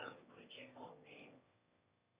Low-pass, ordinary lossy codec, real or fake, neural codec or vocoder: 3.6 kHz; Opus, 64 kbps; fake; autoencoder, 22.05 kHz, a latent of 192 numbers a frame, VITS, trained on one speaker